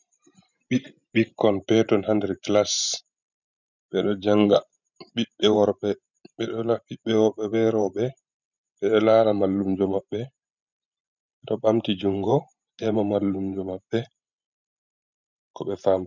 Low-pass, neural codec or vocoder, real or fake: 7.2 kHz; vocoder, 44.1 kHz, 128 mel bands every 512 samples, BigVGAN v2; fake